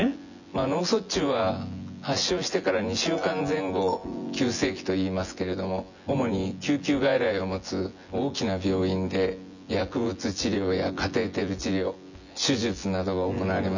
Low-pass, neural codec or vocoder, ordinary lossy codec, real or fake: 7.2 kHz; vocoder, 24 kHz, 100 mel bands, Vocos; none; fake